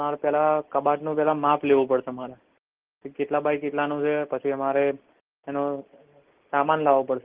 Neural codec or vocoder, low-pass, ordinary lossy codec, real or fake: none; 3.6 kHz; Opus, 16 kbps; real